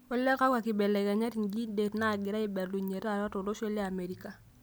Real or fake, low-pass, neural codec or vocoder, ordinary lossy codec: real; none; none; none